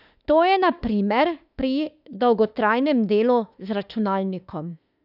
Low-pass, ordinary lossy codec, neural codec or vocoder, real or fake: 5.4 kHz; none; autoencoder, 48 kHz, 32 numbers a frame, DAC-VAE, trained on Japanese speech; fake